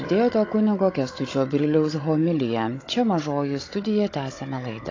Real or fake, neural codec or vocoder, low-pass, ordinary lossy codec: fake; codec, 16 kHz, 16 kbps, FunCodec, trained on Chinese and English, 50 frames a second; 7.2 kHz; AAC, 32 kbps